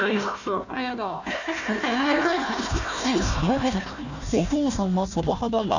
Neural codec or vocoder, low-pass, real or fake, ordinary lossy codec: codec, 16 kHz, 1 kbps, FunCodec, trained on Chinese and English, 50 frames a second; 7.2 kHz; fake; none